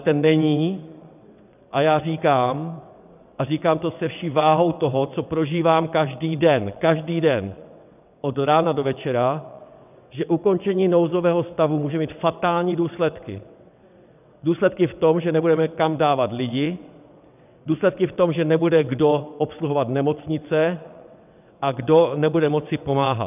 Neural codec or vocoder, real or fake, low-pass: vocoder, 22.05 kHz, 80 mel bands, WaveNeXt; fake; 3.6 kHz